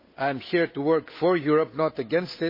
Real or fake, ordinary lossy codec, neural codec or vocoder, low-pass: fake; MP3, 24 kbps; codec, 16 kHz, 8 kbps, FunCodec, trained on Chinese and English, 25 frames a second; 5.4 kHz